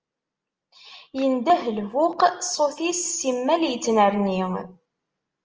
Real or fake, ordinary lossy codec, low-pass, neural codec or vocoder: real; Opus, 24 kbps; 7.2 kHz; none